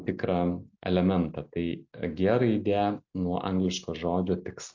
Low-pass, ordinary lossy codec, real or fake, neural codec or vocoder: 7.2 kHz; MP3, 48 kbps; real; none